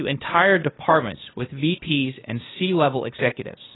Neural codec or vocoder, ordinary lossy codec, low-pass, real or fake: codec, 16 kHz, 0.8 kbps, ZipCodec; AAC, 16 kbps; 7.2 kHz; fake